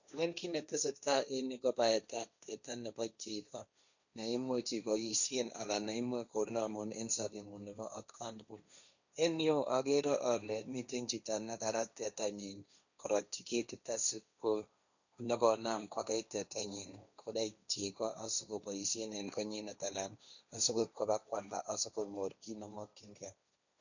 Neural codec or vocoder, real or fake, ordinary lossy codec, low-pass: codec, 16 kHz, 1.1 kbps, Voila-Tokenizer; fake; none; 7.2 kHz